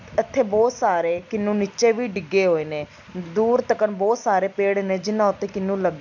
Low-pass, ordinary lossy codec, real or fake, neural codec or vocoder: 7.2 kHz; none; real; none